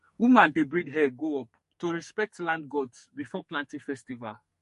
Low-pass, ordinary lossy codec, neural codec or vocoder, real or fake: 14.4 kHz; MP3, 48 kbps; codec, 44.1 kHz, 2.6 kbps, SNAC; fake